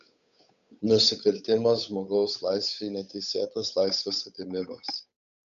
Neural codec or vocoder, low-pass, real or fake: codec, 16 kHz, 8 kbps, FunCodec, trained on Chinese and English, 25 frames a second; 7.2 kHz; fake